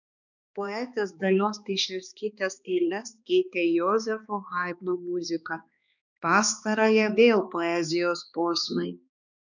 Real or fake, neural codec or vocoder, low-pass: fake; codec, 16 kHz, 2 kbps, X-Codec, HuBERT features, trained on balanced general audio; 7.2 kHz